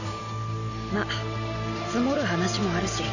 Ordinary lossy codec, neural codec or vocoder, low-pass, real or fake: none; none; 7.2 kHz; real